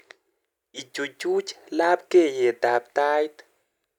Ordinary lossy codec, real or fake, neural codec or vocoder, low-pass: none; real; none; 19.8 kHz